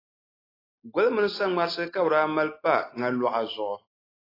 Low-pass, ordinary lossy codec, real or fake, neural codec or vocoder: 5.4 kHz; AAC, 24 kbps; real; none